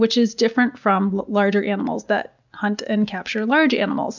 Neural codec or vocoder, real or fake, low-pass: none; real; 7.2 kHz